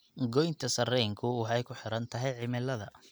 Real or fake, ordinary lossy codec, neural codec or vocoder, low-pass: real; none; none; none